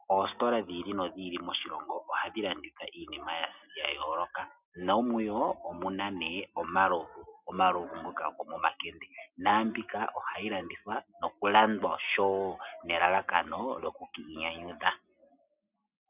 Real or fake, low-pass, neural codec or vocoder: real; 3.6 kHz; none